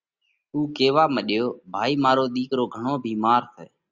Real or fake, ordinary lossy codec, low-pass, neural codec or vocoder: real; Opus, 64 kbps; 7.2 kHz; none